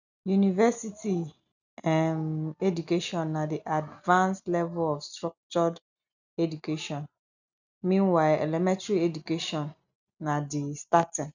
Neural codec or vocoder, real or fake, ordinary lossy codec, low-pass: none; real; none; 7.2 kHz